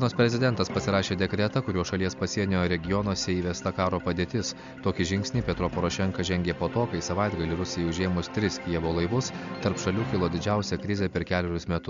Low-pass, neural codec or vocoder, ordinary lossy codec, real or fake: 7.2 kHz; none; MP3, 64 kbps; real